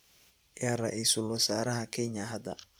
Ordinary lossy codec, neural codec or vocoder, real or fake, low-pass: none; vocoder, 44.1 kHz, 128 mel bands every 512 samples, BigVGAN v2; fake; none